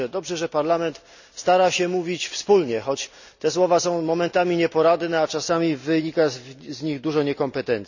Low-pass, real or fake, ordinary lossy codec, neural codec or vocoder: 7.2 kHz; real; none; none